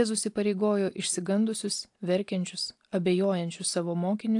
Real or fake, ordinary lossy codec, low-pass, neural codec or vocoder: real; AAC, 64 kbps; 10.8 kHz; none